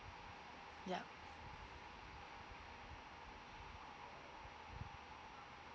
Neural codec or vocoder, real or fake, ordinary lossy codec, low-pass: none; real; none; none